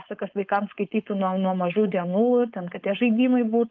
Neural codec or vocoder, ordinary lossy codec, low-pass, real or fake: codec, 16 kHz, 4.8 kbps, FACodec; Opus, 32 kbps; 7.2 kHz; fake